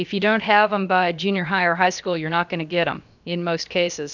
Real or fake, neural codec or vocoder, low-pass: fake; codec, 16 kHz, about 1 kbps, DyCAST, with the encoder's durations; 7.2 kHz